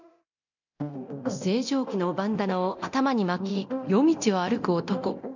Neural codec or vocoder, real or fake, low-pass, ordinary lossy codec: codec, 24 kHz, 0.9 kbps, DualCodec; fake; 7.2 kHz; none